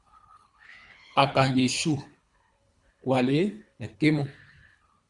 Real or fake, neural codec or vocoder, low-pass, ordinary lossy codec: fake; codec, 24 kHz, 3 kbps, HILCodec; 10.8 kHz; Opus, 64 kbps